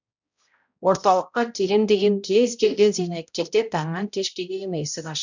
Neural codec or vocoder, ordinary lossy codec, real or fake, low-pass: codec, 16 kHz, 0.5 kbps, X-Codec, HuBERT features, trained on balanced general audio; none; fake; 7.2 kHz